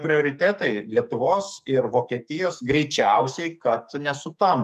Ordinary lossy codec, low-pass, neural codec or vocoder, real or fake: MP3, 96 kbps; 14.4 kHz; codec, 44.1 kHz, 2.6 kbps, SNAC; fake